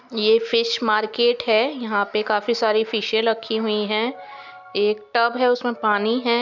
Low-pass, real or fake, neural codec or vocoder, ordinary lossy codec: 7.2 kHz; real; none; none